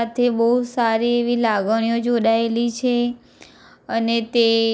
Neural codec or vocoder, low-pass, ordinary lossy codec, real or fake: none; none; none; real